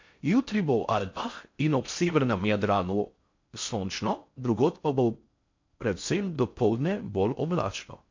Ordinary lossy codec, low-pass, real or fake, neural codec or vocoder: MP3, 48 kbps; 7.2 kHz; fake; codec, 16 kHz in and 24 kHz out, 0.6 kbps, FocalCodec, streaming, 4096 codes